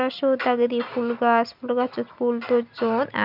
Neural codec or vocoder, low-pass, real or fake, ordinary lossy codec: none; 5.4 kHz; real; none